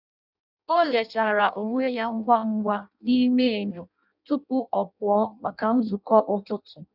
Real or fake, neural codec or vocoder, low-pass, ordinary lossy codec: fake; codec, 16 kHz in and 24 kHz out, 0.6 kbps, FireRedTTS-2 codec; 5.4 kHz; none